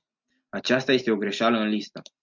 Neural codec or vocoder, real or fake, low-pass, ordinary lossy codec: none; real; 7.2 kHz; MP3, 64 kbps